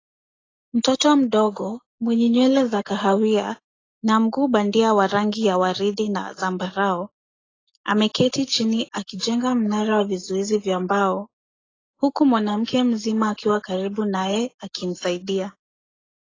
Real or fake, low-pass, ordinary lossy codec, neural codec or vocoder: real; 7.2 kHz; AAC, 32 kbps; none